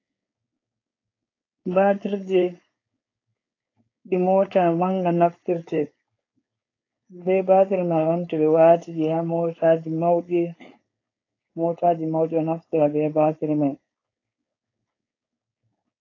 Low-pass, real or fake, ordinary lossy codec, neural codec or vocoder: 7.2 kHz; fake; AAC, 32 kbps; codec, 16 kHz, 4.8 kbps, FACodec